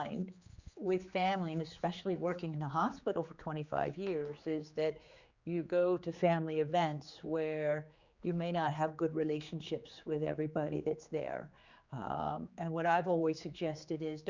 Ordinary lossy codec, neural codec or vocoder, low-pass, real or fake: Opus, 64 kbps; codec, 16 kHz, 4 kbps, X-Codec, HuBERT features, trained on general audio; 7.2 kHz; fake